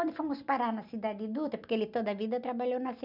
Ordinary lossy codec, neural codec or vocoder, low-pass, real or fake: none; none; 5.4 kHz; real